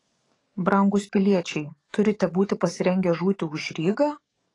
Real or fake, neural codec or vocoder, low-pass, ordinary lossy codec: fake; codec, 44.1 kHz, 7.8 kbps, DAC; 10.8 kHz; AAC, 32 kbps